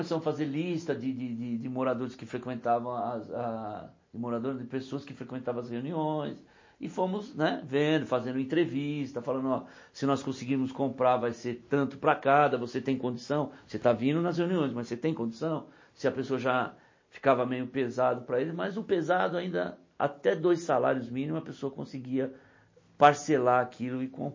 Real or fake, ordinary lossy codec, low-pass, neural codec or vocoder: real; MP3, 32 kbps; 7.2 kHz; none